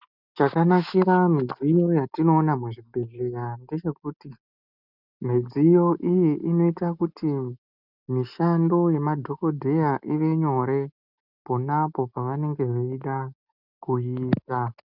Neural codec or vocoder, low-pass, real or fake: none; 5.4 kHz; real